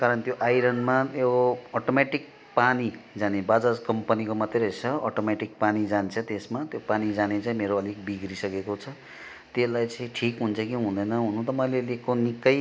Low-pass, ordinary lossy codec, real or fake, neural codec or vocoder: none; none; real; none